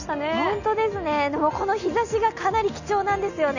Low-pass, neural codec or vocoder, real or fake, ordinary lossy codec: 7.2 kHz; none; real; none